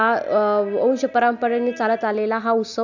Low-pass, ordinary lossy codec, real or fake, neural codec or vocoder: 7.2 kHz; none; real; none